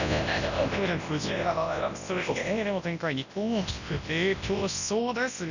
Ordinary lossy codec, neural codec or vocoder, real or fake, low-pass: none; codec, 24 kHz, 0.9 kbps, WavTokenizer, large speech release; fake; 7.2 kHz